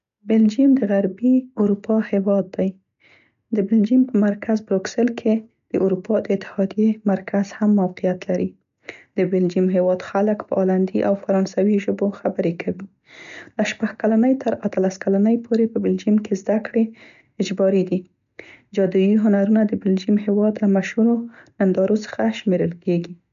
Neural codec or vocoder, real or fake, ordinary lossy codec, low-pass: none; real; none; 7.2 kHz